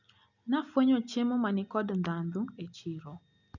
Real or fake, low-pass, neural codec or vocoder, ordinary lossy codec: real; 7.2 kHz; none; none